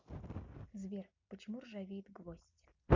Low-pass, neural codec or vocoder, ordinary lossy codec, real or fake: 7.2 kHz; none; MP3, 64 kbps; real